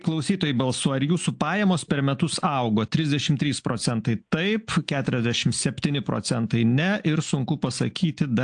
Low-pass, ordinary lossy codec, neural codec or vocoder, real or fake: 9.9 kHz; AAC, 64 kbps; none; real